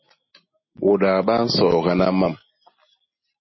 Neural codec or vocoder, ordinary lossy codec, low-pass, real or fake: none; MP3, 24 kbps; 7.2 kHz; real